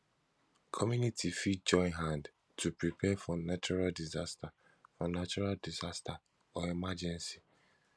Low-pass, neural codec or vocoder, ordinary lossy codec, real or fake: none; none; none; real